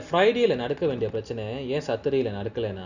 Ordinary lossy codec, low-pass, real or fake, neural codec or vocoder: none; 7.2 kHz; real; none